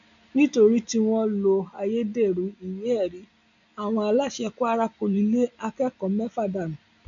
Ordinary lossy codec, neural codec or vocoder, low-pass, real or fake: none; none; 7.2 kHz; real